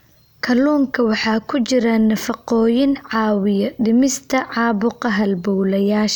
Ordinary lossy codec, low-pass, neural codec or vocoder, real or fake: none; none; none; real